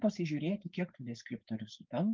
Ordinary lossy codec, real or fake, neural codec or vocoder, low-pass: Opus, 32 kbps; fake; codec, 16 kHz, 4.8 kbps, FACodec; 7.2 kHz